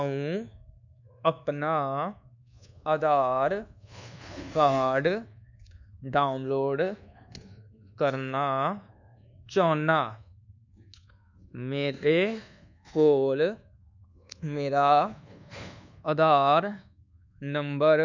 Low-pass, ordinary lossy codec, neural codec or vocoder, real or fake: 7.2 kHz; none; codec, 24 kHz, 1.2 kbps, DualCodec; fake